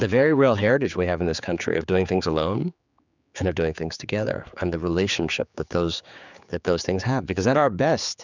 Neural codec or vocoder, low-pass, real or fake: codec, 16 kHz, 4 kbps, X-Codec, HuBERT features, trained on general audio; 7.2 kHz; fake